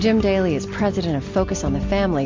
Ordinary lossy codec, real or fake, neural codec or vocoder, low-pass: MP3, 48 kbps; real; none; 7.2 kHz